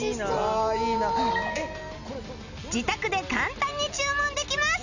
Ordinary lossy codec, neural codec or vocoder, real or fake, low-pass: none; none; real; 7.2 kHz